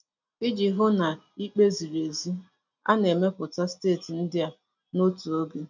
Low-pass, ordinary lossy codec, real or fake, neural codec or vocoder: 7.2 kHz; none; real; none